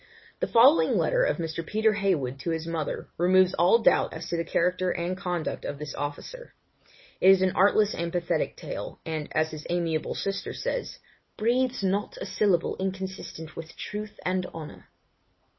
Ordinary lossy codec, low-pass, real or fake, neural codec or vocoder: MP3, 24 kbps; 7.2 kHz; real; none